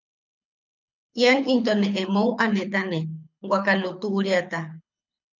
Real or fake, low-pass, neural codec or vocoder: fake; 7.2 kHz; codec, 24 kHz, 6 kbps, HILCodec